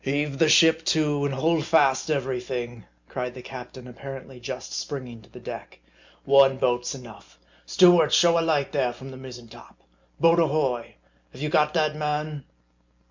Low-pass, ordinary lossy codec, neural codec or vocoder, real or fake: 7.2 kHz; MP3, 64 kbps; none; real